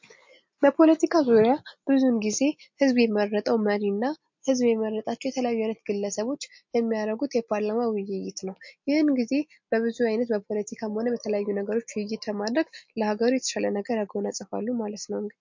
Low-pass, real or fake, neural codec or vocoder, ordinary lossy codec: 7.2 kHz; real; none; MP3, 48 kbps